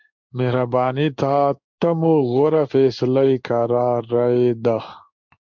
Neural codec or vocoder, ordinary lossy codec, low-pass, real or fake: codec, 16 kHz in and 24 kHz out, 1 kbps, XY-Tokenizer; MP3, 64 kbps; 7.2 kHz; fake